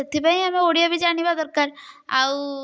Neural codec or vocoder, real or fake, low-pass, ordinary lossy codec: none; real; none; none